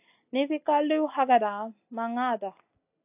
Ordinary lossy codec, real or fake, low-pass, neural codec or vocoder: AAC, 32 kbps; real; 3.6 kHz; none